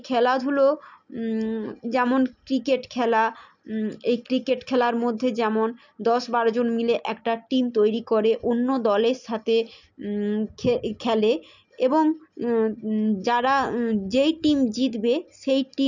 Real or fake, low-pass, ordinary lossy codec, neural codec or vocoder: real; 7.2 kHz; none; none